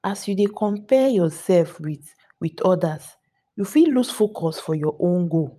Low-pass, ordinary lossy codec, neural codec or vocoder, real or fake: 14.4 kHz; none; vocoder, 44.1 kHz, 128 mel bands every 512 samples, BigVGAN v2; fake